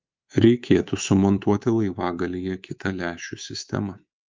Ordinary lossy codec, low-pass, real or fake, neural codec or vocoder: Opus, 24 kbps; 7.2 kHz; fake; codec, 24 kHz, 3.1 kbps, DualCodec